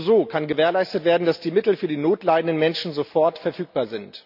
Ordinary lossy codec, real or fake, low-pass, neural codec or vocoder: none; real; 5.4 kHz; none